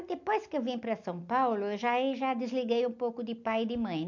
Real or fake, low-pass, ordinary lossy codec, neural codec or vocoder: real; 7.2 kHz; none; none